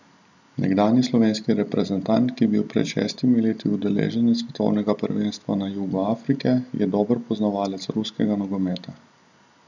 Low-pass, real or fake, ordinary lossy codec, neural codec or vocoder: 7.2 kHz; real; none; none